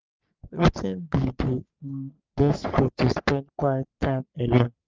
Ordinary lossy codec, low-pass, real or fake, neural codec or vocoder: Opus, 32 kbps; 7.2 kHz; fake; codec, 44.1 kHz, 3.4 kbps, Pupu-Codec